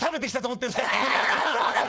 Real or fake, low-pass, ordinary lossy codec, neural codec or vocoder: fake; none; none; codec, 16 kHz, 4.8 kbps, FACodec